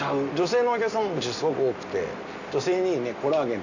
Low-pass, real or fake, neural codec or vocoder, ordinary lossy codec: 7.2 kHz; real; none; none